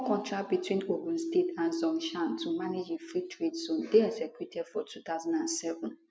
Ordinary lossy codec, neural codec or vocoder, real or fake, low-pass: none; none; real; none